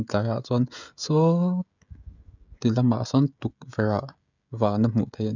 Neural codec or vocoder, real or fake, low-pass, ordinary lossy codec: codec, 16 kHz, 16 kbps, FreqCodec, smaller model; fake; 7.2 kHz; none